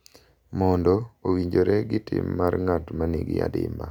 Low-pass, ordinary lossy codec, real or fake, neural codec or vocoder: 19.8 kHz; none; real; none